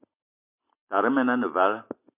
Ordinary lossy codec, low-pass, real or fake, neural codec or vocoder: MP3, 32 kbps; 3.6 kHz; real; none